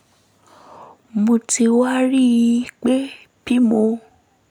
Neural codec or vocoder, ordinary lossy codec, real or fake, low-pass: none; none; real; 19.8 kHz